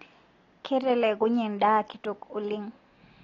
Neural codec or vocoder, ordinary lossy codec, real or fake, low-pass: none; AAC, 32 kbps; real; 7.2 kHz